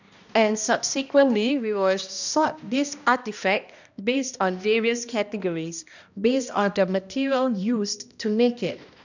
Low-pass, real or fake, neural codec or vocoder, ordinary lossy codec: 7.2 kHz; fake; codec, 16 kHz, 1 kbps, X-Codec, HuBERT features, trained on balanced general audio; none